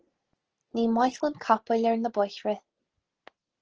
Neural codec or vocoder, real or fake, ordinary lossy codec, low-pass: none; real; Opus, 16 kbps; 7.2 kHz